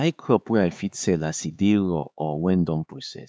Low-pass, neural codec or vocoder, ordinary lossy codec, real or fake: none; codec, 16 kHz, 4 kbps, X-Codec, HuBERT features, trained on LibriSpeech; none; fake